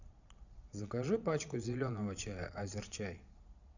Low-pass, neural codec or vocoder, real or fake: 7.2 kHz; vocoder, 22.05 kHz, 80 mel bands, Vocos; fake